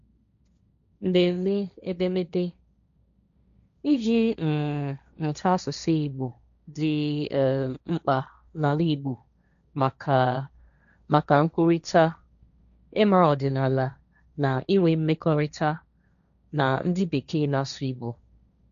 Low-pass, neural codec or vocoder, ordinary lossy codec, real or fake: 7.2 kHz; codec, 16 kHz, 1.1 kbps, Voila-Tokenizer; none; fake